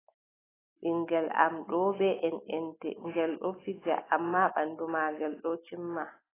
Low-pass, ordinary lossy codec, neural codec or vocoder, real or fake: 3.6 kHz; AAC, 16 kbps; none; real